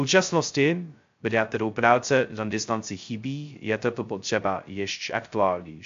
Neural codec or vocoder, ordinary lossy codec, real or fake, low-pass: codec, 16 kHz, 0.2 kbps, FocalCodec; MP3, 48 kbps; fake; 7.2 kHz